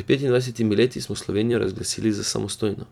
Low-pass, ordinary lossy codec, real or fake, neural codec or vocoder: 19.8 kHz; none; fake; vocoder, 44.1 kHz, 128 mel bands every 256 samples, BigVGAN v2